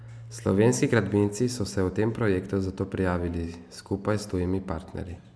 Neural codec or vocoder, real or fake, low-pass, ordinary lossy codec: none; real; none; none